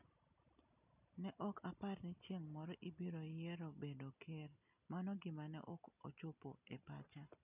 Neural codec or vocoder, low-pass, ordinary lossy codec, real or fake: none; 3.6 kHz; none; real